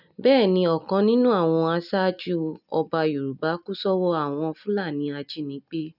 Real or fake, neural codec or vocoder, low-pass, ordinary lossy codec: real; none; 5.4 kHz; none